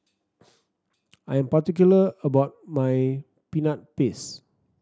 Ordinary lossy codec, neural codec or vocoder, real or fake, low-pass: none; none; real; none